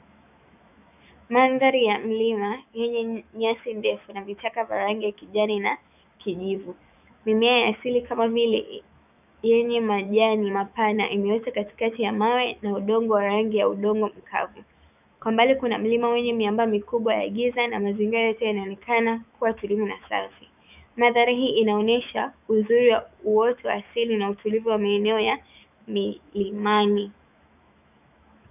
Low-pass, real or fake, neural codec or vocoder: 3.6 kHz; fake; autoencoder, 48 kHz, 128 numbers a frame, DAC-VAE, trained on Japanese speech